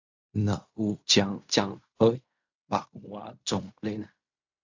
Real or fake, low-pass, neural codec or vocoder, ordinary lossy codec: fake; 7.2 kHz; codec, 16 kHz in and 24 kHz out, 0.4 kbps, LongCat-Audio-Codec, fine tuned four codebook decoder; AAC, 48 kbps